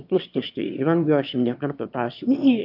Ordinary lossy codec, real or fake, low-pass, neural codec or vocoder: AAC, 48 kbps; fake; 5.4 kHz; autoencoder, 22.05 kHz, a latent of 192 numbers a frame, VITS, trained on one speaker